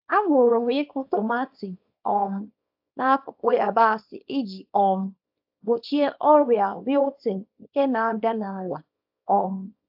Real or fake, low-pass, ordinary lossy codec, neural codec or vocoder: fake; 5.4 kHz; AAC, 48 kbps; codec, 24 kHz, 0.9 kbps, WavTokenizer, small release